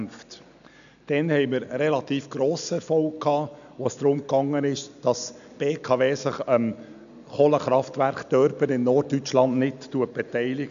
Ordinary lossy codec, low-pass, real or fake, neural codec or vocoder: none; 7.2 kHz; real; none